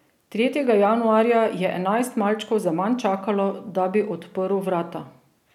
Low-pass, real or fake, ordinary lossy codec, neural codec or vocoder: 19.8 kHz; real; none; none